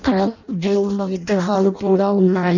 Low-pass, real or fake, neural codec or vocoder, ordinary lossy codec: 7.2 kHz; fake; codec, 16 kHz in and 24 kHz out, 0.6 kbps, FireRedTTS-2 codec; none